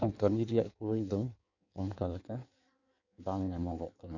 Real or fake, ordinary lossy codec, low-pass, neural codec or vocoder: fake; none; 7.2 kHz; codec, 16 kHz in and 24 kHz out, 1.1 kbps, FireRedTTS-2 codec